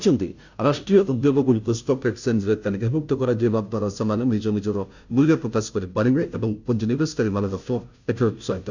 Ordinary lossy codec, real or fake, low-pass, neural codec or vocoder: none; fake; 7.2 kHz; codec, 16 kHz, 0.5 kbps, FunCodec, trained on Chinese and English, 25 frames a second